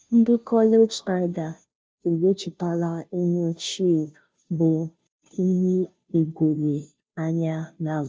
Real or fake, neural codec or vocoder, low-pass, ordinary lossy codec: fake; codec, 16 kHz, 0.5 kbps, FunCodec, trained on Chinese and English, 25 frames a second; none; none